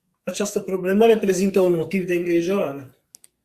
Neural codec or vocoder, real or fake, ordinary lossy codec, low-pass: codec, 44.1 kHz, 2.6 kbps, SNAC; fake; Opus, 64 kbps; 14.4 kHz